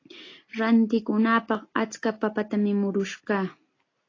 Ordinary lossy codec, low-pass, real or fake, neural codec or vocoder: AAC, 32 kbps; 7.2 kHz; real; none